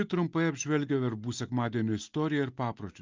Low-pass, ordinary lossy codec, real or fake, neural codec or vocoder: 7.2 kHz; Opus, 24 kbps; real; none